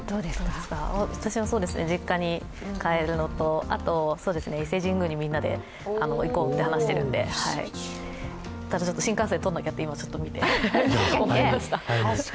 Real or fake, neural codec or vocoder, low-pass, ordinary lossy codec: real; none; none; none